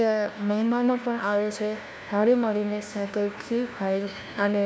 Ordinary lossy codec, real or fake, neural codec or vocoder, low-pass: none; fake; codec, 16 kHz, 1 kbps, FunCodec, trained on LibriTTS, 50 frames a second; none